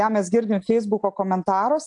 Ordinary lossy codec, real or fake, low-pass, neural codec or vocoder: AAC, 64 kbps; real; 9.9 kHz; none